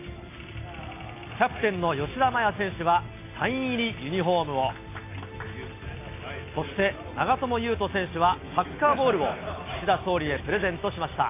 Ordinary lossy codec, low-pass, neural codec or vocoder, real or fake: AAC, 24 kbps; 3.6 kHz; none; real